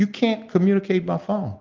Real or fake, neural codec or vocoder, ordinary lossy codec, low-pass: real; none; Opus, 24 kbps; 7.2 kHz